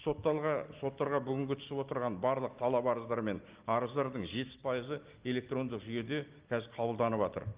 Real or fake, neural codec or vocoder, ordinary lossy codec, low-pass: real; none; Opus, 32 kbps; 3.6 kHz